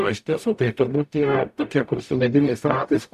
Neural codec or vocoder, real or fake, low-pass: codec, 44.1 kHz, 0.9 kbps, DAC; fake; 14.4 kHz